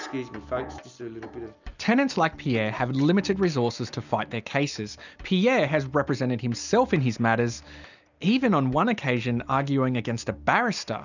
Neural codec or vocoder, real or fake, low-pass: none; real; 7.2 kHz